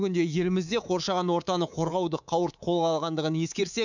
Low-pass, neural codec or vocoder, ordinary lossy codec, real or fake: 7.2 kHz; codec, 24 kHz, 3.1 kbps, DualCodec; none; fake